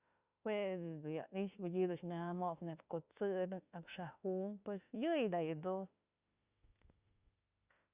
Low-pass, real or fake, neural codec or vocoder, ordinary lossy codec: 3.6 kHz; fake; autoencoder, 48 kHz, 32 numbers a frame, DAC-VAE, trained on Japanese speech; Opus, 64 kbps